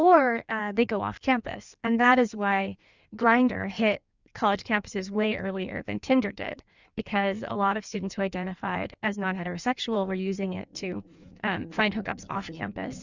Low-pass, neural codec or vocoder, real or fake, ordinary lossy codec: 7.2 kHz; codec, 16 kHz in and 24 kHz out, 1.1 kbps, FireRedTTS-2 codec; fake; Opus, 64 kbps